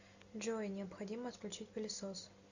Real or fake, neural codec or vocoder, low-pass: real; none; 7.2 kHz